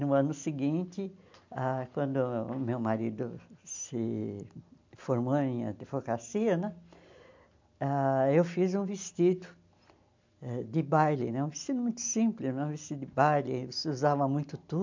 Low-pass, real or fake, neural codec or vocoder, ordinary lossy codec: 7.2 kHz; real; none; MP3, 64 kbps